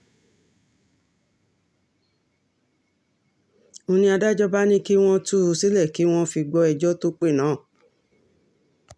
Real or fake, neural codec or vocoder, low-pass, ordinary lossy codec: real; none; none; none